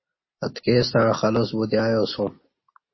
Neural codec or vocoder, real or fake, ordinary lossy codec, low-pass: none; real; MP3, 24 kbps; 7.2 kHz